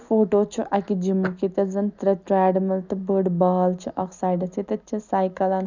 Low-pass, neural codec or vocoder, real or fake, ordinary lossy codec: 7.2 kHz; none; real; none